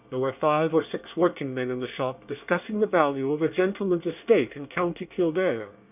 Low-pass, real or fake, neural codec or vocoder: 3.6 kHz; fake; codec, 24 kHz, 1 kbps, SNAC